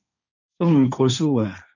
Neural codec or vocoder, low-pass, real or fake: codec, 16 kHz, 1.1 kbps, Voila-Tokenizer; 7.2 kHz; fake